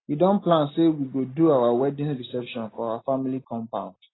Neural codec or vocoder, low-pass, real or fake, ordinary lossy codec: none; 7.2 kHz; real; AAC, 16 kbps